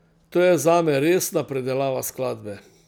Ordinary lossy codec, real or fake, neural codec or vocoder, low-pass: none; real; none; none